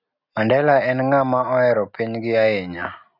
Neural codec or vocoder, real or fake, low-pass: none; real; 5.4 kHz